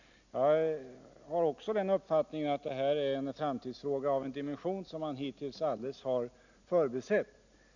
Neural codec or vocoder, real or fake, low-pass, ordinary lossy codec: none; real; 7.2 kHz; none